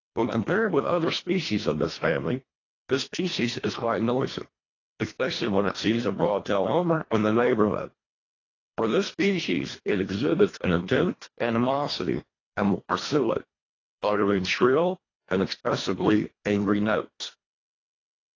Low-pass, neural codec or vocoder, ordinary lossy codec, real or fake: 7.2 kHz; codec, 24 kHz, 1.5 kbps, HILCodec; AAC, 32 kbps; fake